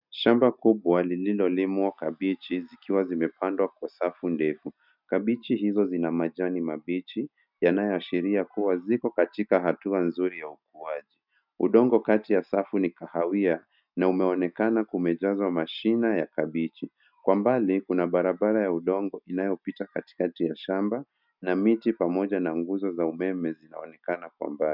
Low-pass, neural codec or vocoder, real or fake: 5.4 kHz; none; real